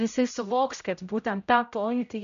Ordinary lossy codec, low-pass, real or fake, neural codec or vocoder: MP3, 96 kbps; 7.2 kHz; fake; codec, 16 kHz, 0.5 kbps, X-Codec, HuBERT features, trained on general audio